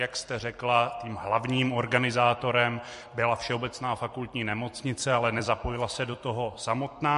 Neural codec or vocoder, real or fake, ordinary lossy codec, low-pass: vocoder, 44.1 kHz, 128 mel bands every 256 samples, BigVGAN v2; fake; MP3, 48 kbps; 14.4 kHz